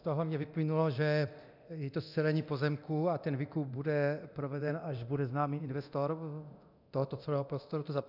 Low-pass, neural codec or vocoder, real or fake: 5.4 kHz; codec, 24 kHz, 0.9 kbps, DualCodec; fake